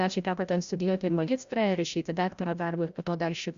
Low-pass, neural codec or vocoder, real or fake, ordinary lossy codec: 7.2 kHz; codec, 16 kHz, 0.5 kbps, FreqCodec, larger model; fake; Opus, 64 kbps